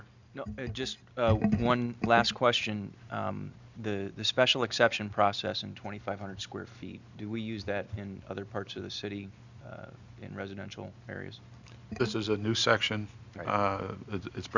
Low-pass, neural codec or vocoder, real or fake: 7.2 kHz; none; real